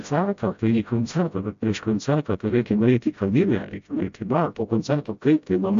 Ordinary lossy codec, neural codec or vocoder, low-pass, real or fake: AAC, 64 kbps; codec, 16 kHz, 0.5 kbps, FreqCodec, smaller model; 7.2 kHz; fake